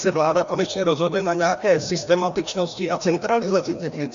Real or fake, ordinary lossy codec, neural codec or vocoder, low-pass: fake; MP3, 64 kbps; codec, 16 kHz, 1 kbps, FreqCodec, larger model; 7.2 kHz